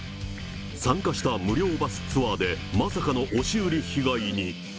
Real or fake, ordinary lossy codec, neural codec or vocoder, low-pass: real; none; none; none